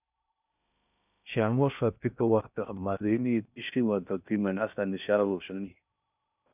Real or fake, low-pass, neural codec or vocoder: fake; 3.6 kHz; codec, 16 kHz in and 24 kHz out, 0.6 kbps, FocalCodec, streaming, 2048 codes